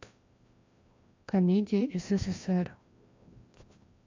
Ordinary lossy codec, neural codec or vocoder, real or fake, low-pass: MP3, 48 kbps; codec, 16 kHz, 1 kbps, FreqCodec, larger model; fake; 7.2 kHz